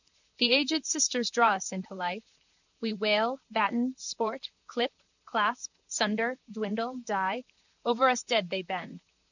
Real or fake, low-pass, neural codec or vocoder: fake; 7.2 kHz; vocoder, 44.1 kHz, 128 mel bands, Pupu-Vocoder